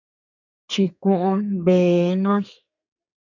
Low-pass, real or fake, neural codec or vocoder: 7.2 kHz; fake; codec, 32 kHz, 1.9 kbps, SNAC